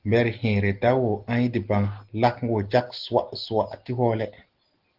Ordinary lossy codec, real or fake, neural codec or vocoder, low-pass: Opus, 16 kbps; real; none; 5.4 kHz